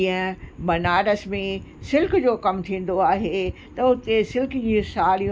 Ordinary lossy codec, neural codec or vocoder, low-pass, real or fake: none; none; none; real